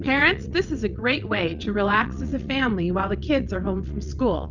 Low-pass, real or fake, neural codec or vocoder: 7.2 kHz; fake; vocoder, 22.05 kHz, 80 mel bands, WaveNeXt